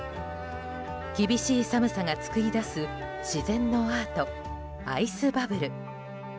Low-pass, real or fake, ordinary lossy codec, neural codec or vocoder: none; real; none; none